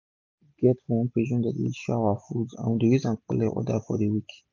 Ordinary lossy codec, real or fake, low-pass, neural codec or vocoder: none; real; 7.2 kHz; none